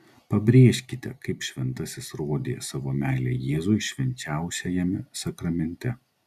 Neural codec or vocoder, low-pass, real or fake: none; 14.4 kHz; real